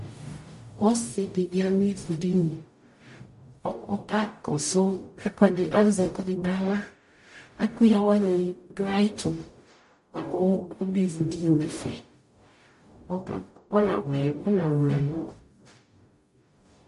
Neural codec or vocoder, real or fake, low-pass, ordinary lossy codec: codec, 44.1 kHz, 0.9 kbps, DAC; fake; 14.4 kHz; MP3, 48 kbps